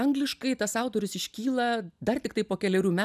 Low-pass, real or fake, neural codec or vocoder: 14.4 kHz; real; none